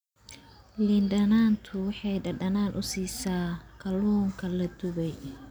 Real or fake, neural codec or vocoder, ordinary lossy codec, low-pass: real; none; none; none